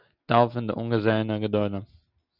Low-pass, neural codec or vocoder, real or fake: 5.4 kHz; none; real